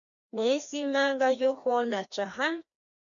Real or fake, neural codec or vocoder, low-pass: fake; codec, 16 kHz, 1 kbps, FreqCodec, larger model; 7.2 kHz